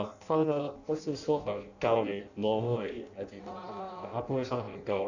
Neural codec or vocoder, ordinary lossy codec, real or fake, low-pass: codec, 16 kHz in and 24 kHz out, 0.6 kbps, FireRedTTS-2 codec; none; fake; 7.2 kHz